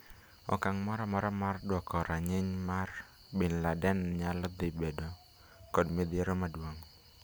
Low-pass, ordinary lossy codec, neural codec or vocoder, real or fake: none; none; none; real